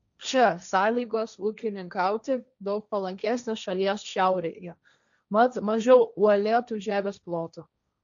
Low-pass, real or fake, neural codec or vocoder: 7.2 kHz; fake; codec, 16 kHz, 1.1 kbps, Voila-Tokenizer